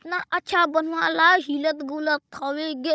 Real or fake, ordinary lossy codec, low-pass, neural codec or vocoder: fake; none; none; codec, 16 kHz, 16 kbps, FunCodec, trained on Chinese and English, 50 frames a second